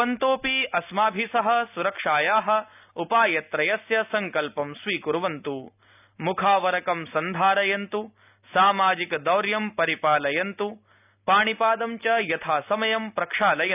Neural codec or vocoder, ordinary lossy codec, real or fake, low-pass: none; none; real; 3.6 kHz